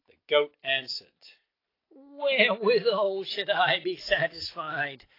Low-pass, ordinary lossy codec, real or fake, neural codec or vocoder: 5.4 kHz; AAC, 24 kbps; real; none